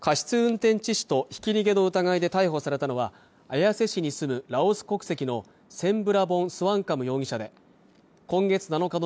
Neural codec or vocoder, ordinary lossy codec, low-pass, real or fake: none; none; none; real